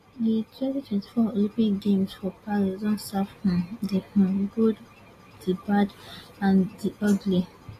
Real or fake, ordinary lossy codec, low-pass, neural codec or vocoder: real; AAC, 48 kbps; 14.4 kHz; none